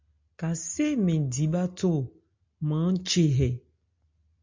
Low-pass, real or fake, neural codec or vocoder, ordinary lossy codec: 7.2 kHz; real; none; MP3, 64 kbps